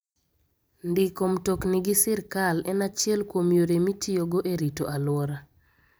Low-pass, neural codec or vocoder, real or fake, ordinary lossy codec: none; vocoder, 44.1 kHz, 128 mel bands every 256 samples, BigVGAN v2; fake; none